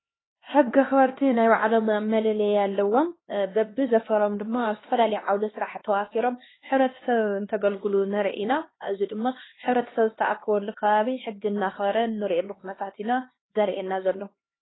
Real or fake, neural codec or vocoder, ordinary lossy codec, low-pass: fake; codec, 16 kHz, 2 kbps, X-Codec, HuBERT features, trained on LibriSpeech; AAC, 16 kbps; 7.2 kHz